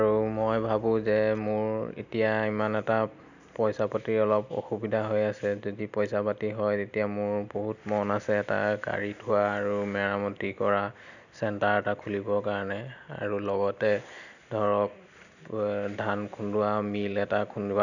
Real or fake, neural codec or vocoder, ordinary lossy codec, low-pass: real; none; none; 7.2 kHz